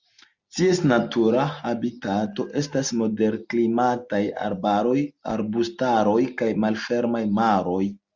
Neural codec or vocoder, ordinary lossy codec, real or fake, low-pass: none; Opus, 64 kbps; real; 7.2 kHz